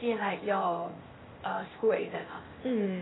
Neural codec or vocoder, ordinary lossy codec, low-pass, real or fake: codec, 16 kHz, 0.8 kbps, ZipCodec; AAC, 16 kbps; 7.2 kHz; fake